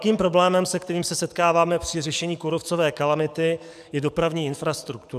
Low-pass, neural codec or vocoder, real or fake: 14.4 kHz; codec, 44.1 kHz, 7.8 kbps, DAC; fake